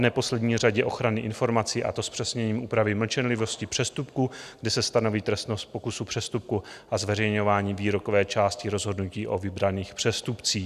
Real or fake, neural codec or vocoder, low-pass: real; none; 14.4 kHz